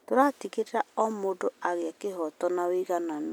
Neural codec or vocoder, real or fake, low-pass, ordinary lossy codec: none; real; none; none